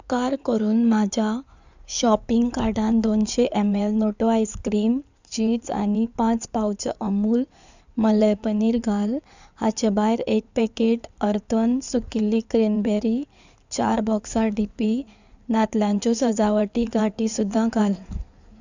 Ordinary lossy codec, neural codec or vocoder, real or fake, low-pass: none; codec, 16 kHz in and 24 kHz out, 2.2 kbps, FireRedTTS-2 codec; fake; 7.2 kHz